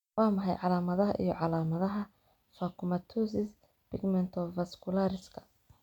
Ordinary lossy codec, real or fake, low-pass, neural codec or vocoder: none; real; 19.8 kHz; none